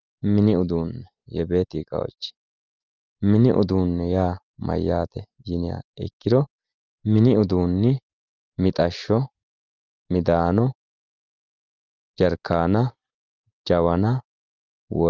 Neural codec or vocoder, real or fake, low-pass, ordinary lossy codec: none; real; 7.2 kHz; Opus, 32 kbps